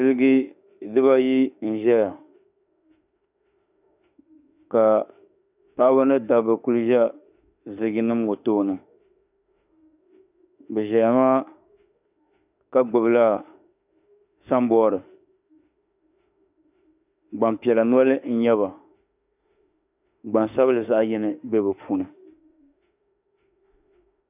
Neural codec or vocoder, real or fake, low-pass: autoencoder, 48 kHz, 32 numbers a frame, DAC-VAE, trained on Japanese speech; fake; 3.6 kHz